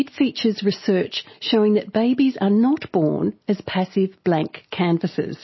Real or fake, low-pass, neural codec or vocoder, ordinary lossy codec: real; 7.2 kHz; none; MP3, 24 kbps